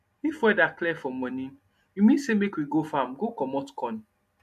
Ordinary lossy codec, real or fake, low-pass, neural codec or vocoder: MP3, 64 kbps; real; 14.4 kHz; none